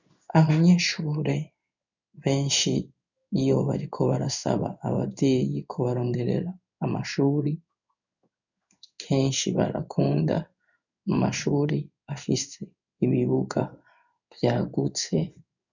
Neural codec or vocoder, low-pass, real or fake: codec, 16 kHz in and 24 kHz out, 1 kbps, XY-Tokenizer; 7.2 kHz; fake